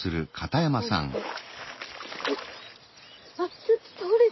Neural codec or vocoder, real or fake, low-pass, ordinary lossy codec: none; real; 7.2 kHz; MP3, 24 kbps